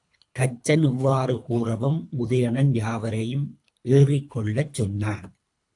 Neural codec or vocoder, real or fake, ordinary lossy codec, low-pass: codec, 24 kHz, 3 kbps, HILCodec; fake; AAC, 64 kbps; 10.8 kHz